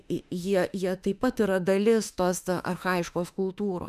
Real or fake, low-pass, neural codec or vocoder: fake; 14.4 kHz; autoencoder, 48 kHz, 32 numbers a frame, DAC-VAE, trained on Japanese speech